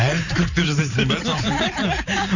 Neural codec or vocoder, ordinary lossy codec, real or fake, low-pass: vocoder, 44.1 kHz, 128 mel bands every 512 samples, BigVGAN v2; none; fake; 7.2 kHz